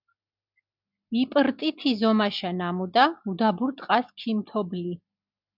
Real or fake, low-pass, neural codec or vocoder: real; 5.4 kHz; none